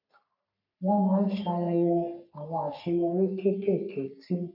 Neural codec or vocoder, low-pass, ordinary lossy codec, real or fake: codec, 44.1 kHz, 3.4 kbps, Pupu-Codec; 5.4 kHz; none; fake